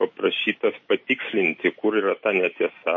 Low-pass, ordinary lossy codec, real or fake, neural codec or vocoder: 7.2 kHz; MP3, 32 kbps; real; none